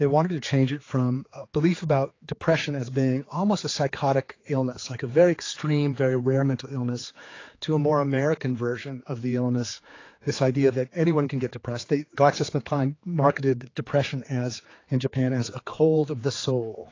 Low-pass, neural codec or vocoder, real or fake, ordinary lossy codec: 7.2 kHz; codec, 16 kHz, 4 kbps, X-Codec, HuBERT features, trained on general audio; fake; AAC, 32 kbps